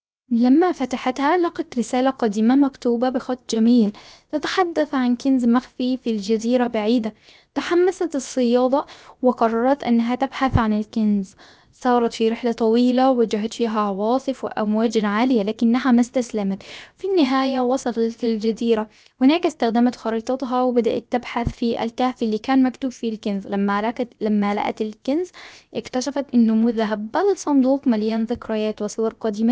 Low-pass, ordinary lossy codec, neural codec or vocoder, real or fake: none; none; codec, 16 kHz, 0.7 kbps, FocalCodec; fake